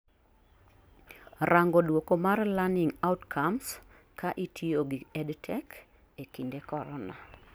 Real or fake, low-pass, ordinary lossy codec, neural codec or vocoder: real; none; none; none